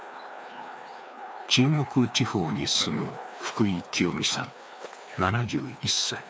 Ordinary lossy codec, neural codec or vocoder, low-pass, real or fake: none; codec, 16 kHz, 2 kbps, FreqCodec, larger model; none; fake